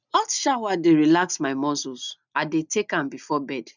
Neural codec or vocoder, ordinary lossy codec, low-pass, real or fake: none; none; 7.2 kHz; real